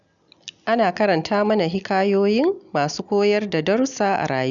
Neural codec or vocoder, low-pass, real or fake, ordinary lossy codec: none; 7.2 kHz; real; none